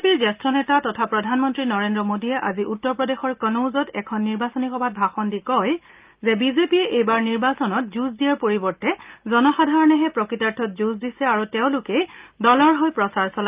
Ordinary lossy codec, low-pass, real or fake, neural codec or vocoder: Opus, 24 kbps; 3.6 kHz; real; none